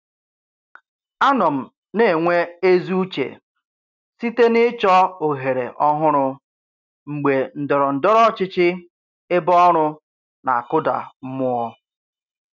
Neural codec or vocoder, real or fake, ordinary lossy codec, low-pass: none; real; none; 7.2 kHz